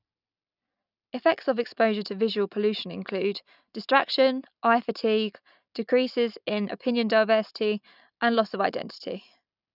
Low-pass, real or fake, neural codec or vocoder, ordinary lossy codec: 5.4 kHz; real; none; none